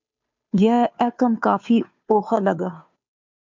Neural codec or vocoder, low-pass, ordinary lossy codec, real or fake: codec, 16 kHz, 2 kbps, FunCodec, trained on Chinese and English, 25 frames a second; 7.2 kHz; MP3, 64 kbps; fake